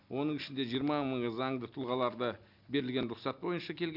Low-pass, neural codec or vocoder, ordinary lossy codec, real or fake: 5.4 kHz; none; none; real